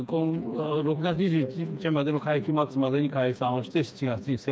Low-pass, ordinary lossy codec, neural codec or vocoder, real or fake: none; none; codec, 16 kHz, 2 kbps, FreqCodec, smaller model; fake